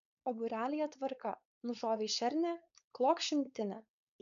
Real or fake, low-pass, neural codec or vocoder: fake; 7.2 kHz; codec, 16 kHz, 4.8 kbps, FACodec